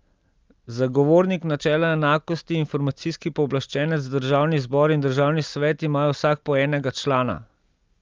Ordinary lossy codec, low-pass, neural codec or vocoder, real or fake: Opus, 32 kbps; 7.2 kHz; none; real